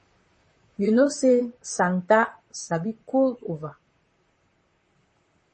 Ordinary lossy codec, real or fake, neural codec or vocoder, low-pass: MP3, 32 kbps; fake; vocoder, 44.1 kHz, 128 mel bands, Pupu-Vocoder; 10.8 kHz